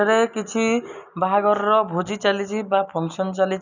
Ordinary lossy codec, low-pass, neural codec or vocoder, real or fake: none; 7.2 kHz; none; real